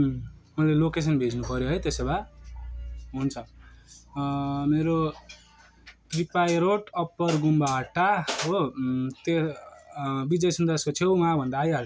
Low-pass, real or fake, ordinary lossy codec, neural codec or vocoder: none; real; none; none